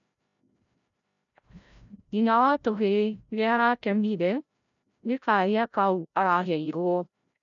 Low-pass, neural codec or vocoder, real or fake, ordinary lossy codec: 7.2 kHz; codec, 16 kHz, 0.5 kbps, FreqCodec, larger model; fake; none